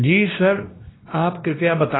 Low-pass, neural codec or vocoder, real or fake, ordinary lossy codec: 7.2 kHz; codec, 16 kHz, 1 kbps, X-Codec, WavLM features, trained on Multilingual LibriSpeech; fake; AAC, 16 kbps